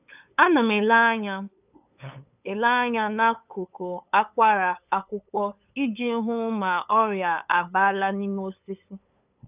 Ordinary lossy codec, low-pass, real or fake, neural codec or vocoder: none; 3.6 kHz; fake; codec, 16 kHz, 8 kbps, FunCodec, trained on LibriTTS, 25 frames a second